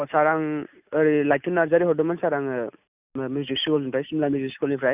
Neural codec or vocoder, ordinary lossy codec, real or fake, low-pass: none; none; real; 3.6 kHz